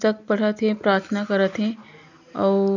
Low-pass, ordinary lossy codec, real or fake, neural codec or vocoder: 7.2 kHz; none; real; none